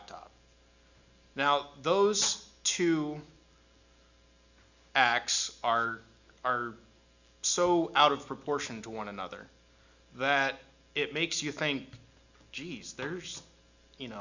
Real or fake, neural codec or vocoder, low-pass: real; none; 7.2 kHz